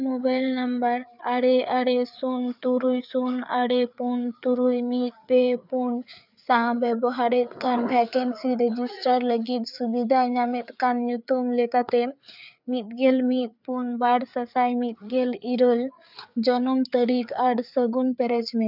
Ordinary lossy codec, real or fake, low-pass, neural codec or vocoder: none; fake; 5.4 kHz; codec, 16 kHz, 4 kbps, FreqCodec, larger model